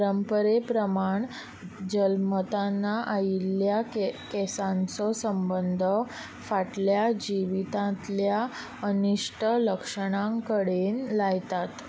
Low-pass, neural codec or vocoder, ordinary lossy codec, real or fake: none; none; none; real